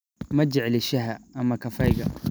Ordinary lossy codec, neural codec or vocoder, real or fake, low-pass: none; none; real; none